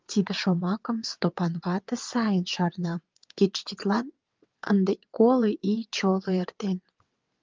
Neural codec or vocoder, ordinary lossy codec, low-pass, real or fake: vocoder, 22.05 kHz, 80 mel bands, WaveNeXt; Opus, 32 kbps; 7.2 kHz; fake